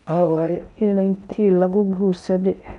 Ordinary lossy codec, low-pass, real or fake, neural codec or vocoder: none; 10.8 kHz; fake; codec, 16 kHz in and 24 kHz out, 0.6 kbps, FocalCodec, streaming, 4096 codes